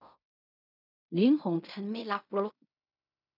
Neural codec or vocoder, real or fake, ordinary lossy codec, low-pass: codec, 16 kHz in and 24 kHz out, 0.4 kbps, LongCat-Audio-Codec, fine tuned four codebook decoder; fake; none; 5.4 kHz